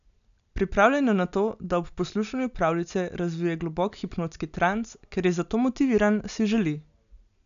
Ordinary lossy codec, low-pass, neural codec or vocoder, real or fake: none; 7.2 kHz; none; real